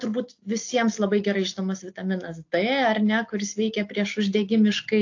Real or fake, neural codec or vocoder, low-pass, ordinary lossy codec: real; none; 7.2 kHz; AAC, 48 kbps